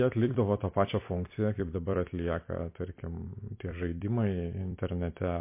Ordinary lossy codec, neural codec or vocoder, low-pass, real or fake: MP3, 24 kbps; vocoder, 44.1 kHz, 128 mel bands every 256 samples, BigVGAN v2; 3.6 kHz; fake